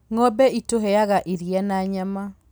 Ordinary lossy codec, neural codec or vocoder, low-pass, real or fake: none; none; none; real